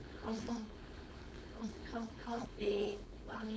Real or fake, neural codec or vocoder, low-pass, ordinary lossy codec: fake; codec, 16 kHz, 4.8 kbps, FACodec; none; none